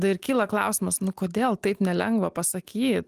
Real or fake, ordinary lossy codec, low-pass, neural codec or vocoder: real; Opus, 24 kbps; 14.4 kHz; none